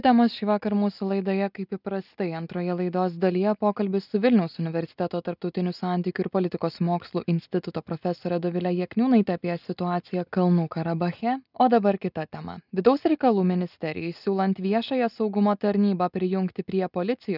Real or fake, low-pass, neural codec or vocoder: real; 5.4 kHz; none